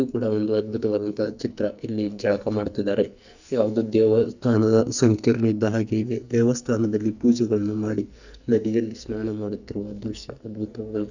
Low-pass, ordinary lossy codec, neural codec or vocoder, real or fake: 7.2 kHz; none; codec, 44.1 kHz, 2.6 kbps, SNAC; fake